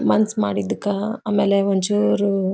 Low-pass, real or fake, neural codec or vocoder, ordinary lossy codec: none; real; none; none